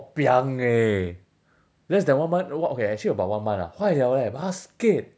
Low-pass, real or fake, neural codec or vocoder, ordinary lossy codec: none; real; none; none